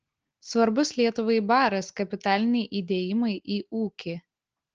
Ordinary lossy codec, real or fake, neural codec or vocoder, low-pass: Opus, 16 kbps; real; none; 7.2 kHz